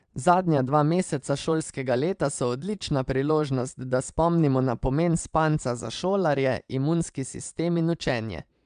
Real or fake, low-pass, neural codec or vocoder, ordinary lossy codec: fake; 9.9 kHz; vocoder, 22.05 kHz, 80 mel bands, Vocos; MP3, 96 kbps